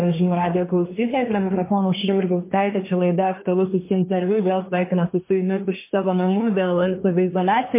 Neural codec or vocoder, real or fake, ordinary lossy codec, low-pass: codec, 24 kHz, 1 kbps, SNAC; fake; MP3, 24 kbps; 3.6 kHz